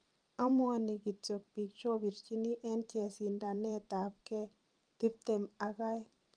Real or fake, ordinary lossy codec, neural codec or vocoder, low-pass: fake; Opus, 32 kbps; vocoder, 44.1 kHz, 128 mel bands every 512 samples, BigVGAN v2; 9.9 kHz